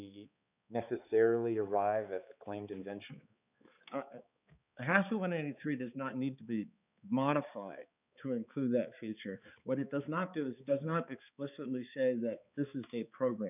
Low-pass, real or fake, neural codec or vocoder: 3.6 kHz; fake; codec, 16 kHz, 4 kbps, X-Codec, HuBERT features, trained on balanced general audio